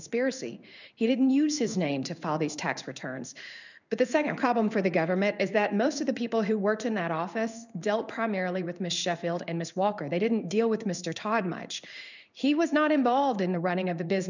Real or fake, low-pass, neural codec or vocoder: fake; 7.2 kHz; codec, 16 kHz in and 24 kHz out, 1 kbps, XY-Tokenizer